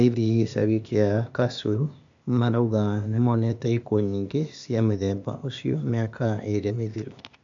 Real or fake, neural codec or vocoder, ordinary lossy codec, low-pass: fake; codec, 16 kHz, 0.8 kbps, ZipCodec; MP3, 64 kbps; 7.2 kHz